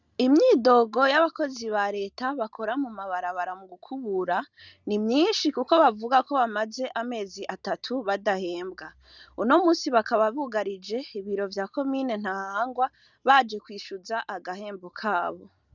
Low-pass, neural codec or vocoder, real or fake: 7.2 kHz; none; real